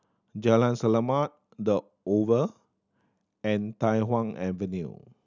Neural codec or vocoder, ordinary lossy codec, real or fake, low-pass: none; none; real; 7.2 kHz